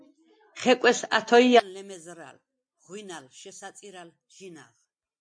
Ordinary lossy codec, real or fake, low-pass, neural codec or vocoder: MP3, 48 kbps; real; 9.9 kHz; none